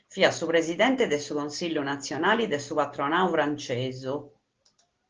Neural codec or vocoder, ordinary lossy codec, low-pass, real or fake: none; Opus, 16 kbps; 7.2 kHz; real